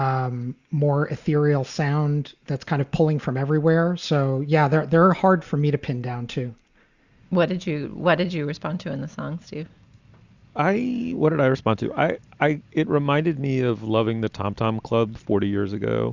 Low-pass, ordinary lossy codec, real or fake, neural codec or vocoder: 7.2 kHz; Opus, 64 kbps; real; none